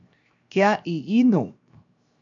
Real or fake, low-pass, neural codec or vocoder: fake; 7.2 kHz; codec, 16 kHz, 0.7 kbps, FocalCodec